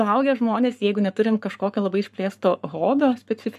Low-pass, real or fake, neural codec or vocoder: 14.4 kHz; fake; codec, 44.1 kHz, 7.8 kbps, Pupu-Codec